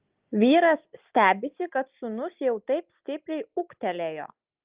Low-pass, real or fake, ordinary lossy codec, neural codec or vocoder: 3.6 kHz; real; Opus, 32 kbps; none